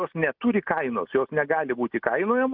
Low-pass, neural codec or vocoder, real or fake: 5.4 kHz; none; real